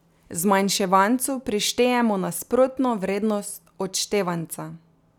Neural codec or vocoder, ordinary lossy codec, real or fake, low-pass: none; none; real; 19.8 kHz